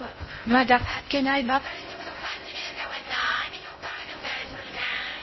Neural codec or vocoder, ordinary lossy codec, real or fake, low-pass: codec, 16 kHz in and 24 kHz out, 0.6 kbps, FocalCodec, streaming, 2048 codes; MP3, 24 kbps; fake; 7.2 kHz